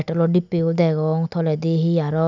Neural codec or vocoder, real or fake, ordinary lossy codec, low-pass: none; real; none; 7.2 kHz